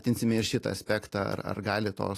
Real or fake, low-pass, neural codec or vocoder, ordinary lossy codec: real; 14.4 kHz; none; AAC, 48 kbps